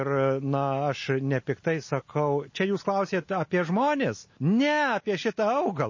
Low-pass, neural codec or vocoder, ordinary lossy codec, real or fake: 7.2 kHz; none; MP3, 32 kbps; real